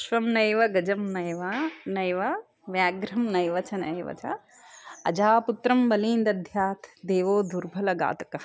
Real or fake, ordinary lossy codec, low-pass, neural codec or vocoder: real; none; none; none